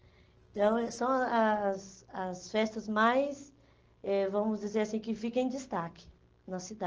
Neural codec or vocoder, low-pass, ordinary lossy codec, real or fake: none; 7.2 kHz; Opus, 16 kbps; real